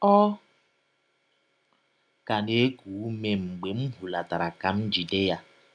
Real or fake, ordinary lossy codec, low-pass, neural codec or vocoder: real; none; 9.9 kHz; none